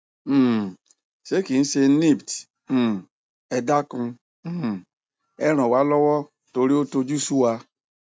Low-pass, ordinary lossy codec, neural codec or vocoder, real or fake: none; none; none; real